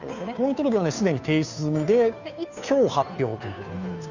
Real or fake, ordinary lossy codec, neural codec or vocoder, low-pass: fake; none; codec, 16 kHz, 2 kbps, FunCodec, trained on Chinese and English, 25 frames a second; 7.2 kHz